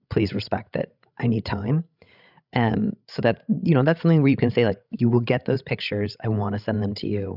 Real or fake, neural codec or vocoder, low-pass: fake; codec, 16 kHz, 16 kbps, FreqCodec, larger model; 5.4 kHz